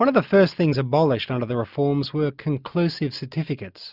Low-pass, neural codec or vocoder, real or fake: 5.4 kHz; none; real